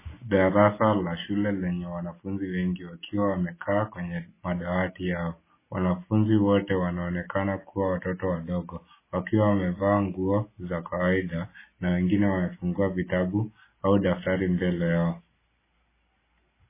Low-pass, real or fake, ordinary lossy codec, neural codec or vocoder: 3.6 kHz; real; MP3, 16 kbps; none